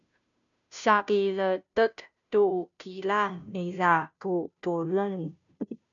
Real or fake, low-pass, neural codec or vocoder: fake; 7.2 kHz; codec, 16 kHz, 0.5 kbps, FunCodec, trained on Chinese and English, 25 frames a second